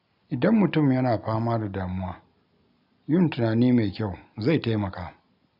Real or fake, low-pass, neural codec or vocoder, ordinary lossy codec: real; 5.4 kHz; none; none